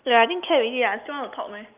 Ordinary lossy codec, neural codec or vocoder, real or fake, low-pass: Opus, 24 kbps; none; real; 3.6 kHz